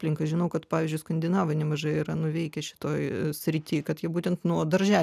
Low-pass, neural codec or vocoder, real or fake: 14.4 kHz; none; real